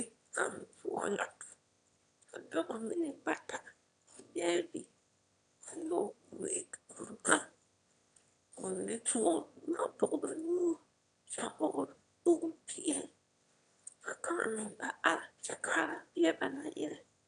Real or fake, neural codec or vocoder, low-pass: fake; autoencoder, 22.05 kHz, a latent of 192 numbers a frame, VITS, trained on one speaker; 9.9 kHz